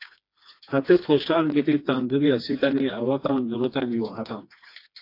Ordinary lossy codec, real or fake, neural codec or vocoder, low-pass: AAC, 32 kbps; fake; codec, 16 kHz, 2 kbps, FreqCodec, smaller model; 5.4 kHz